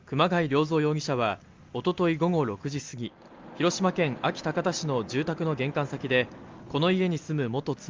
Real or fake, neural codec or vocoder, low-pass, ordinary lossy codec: real; none; 7.2 kHz; Opus, 32 kbps